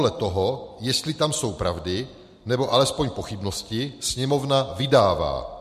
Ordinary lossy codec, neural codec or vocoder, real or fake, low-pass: MP3, 64 kbps; none; real; 14.4 kHz